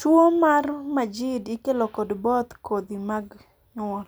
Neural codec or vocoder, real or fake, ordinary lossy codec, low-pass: none; real; none; none